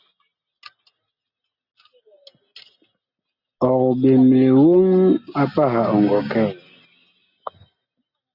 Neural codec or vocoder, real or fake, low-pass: none; real; 5.4 kHz